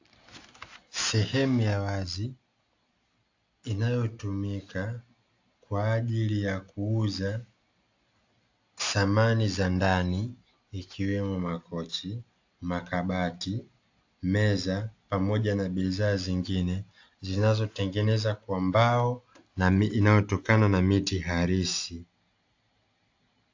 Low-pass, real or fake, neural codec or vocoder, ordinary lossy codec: 7.2 kHz; real; none; AAC, 48 kbps